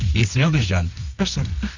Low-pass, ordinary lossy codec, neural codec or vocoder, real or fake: 7.2 kHz; Opus, 64 kbps; codec, 24 kHz, 0.9 kbps, WavTokenizer, medium music audio release; fake